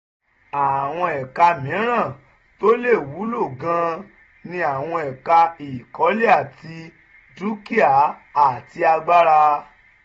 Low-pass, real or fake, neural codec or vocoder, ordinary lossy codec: 9.9 kHz; real; none; AAC, 24 kbps